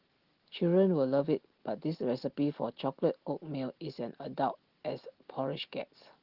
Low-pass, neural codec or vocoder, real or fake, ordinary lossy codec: 5.4 kHz; none; real; Opus, 16 kbps